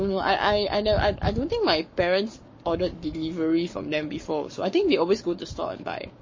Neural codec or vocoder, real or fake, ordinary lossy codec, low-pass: codec, 44.1 kHz, 7.8 kbps, Pupu-Codec; fake; MP3, 32 kbps; 7.2 kHz